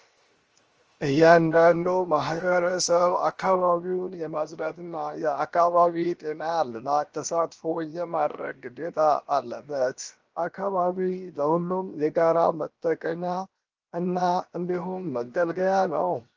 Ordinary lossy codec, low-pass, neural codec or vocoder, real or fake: Opus, 24 kbps; 7.2 kHz; codec, 16 kHz, 0.7 kbps, FocalCodec; fake